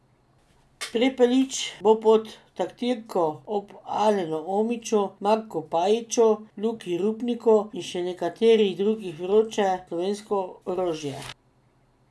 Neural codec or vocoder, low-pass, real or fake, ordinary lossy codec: none; none; real; none